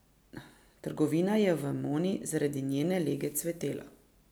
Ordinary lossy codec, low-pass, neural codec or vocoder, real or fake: none; none; none; real